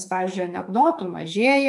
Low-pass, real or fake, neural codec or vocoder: 10.8 kHz; fake; autoencoder, 48 kHz, 32 numbers a frame, DAC-VAE, trained on Japanese speech